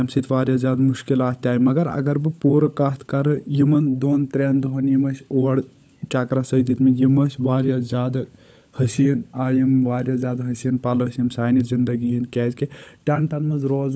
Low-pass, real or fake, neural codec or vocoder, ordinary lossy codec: none; fake; codec, 16 kHz, 16 kbps, FunCodec, trained on LibriTTS, 50 frames a second; none